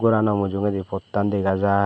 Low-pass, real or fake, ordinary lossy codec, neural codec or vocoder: none; real; none; none